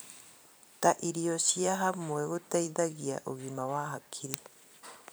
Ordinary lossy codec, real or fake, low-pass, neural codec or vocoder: none; fake; none; vocoder, 44.1 kHz, 128 mel bands every 256 samples, BigVGAN v2